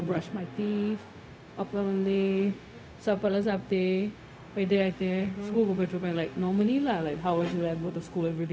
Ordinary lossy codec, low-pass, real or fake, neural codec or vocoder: none; none; fake; codec, 16 kHz, 0.4 kbps, LongCat-Audio-Codec